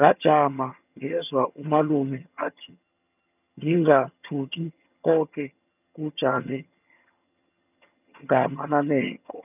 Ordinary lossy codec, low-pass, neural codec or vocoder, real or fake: none; 3.6 kHz; vocoder, 22.05 kHz, 80 mel bands, HiFi-GAN; fake